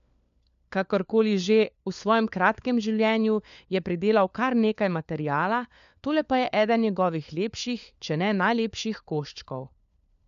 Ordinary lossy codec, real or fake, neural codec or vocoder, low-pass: AAC, 96 kbps; fake; codec, 16 kHz, 4 kbps, FunCodec, trained on LibriTTS, 50 frames a second; 7.2 kHz